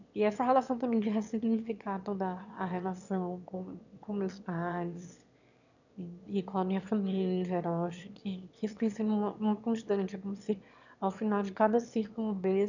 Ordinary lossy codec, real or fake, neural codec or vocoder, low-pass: none; fake; autoencoder, 22.05 kHz, a latent of 192 numbers a frame, VITS, trained on one speaker; 7.2 kHz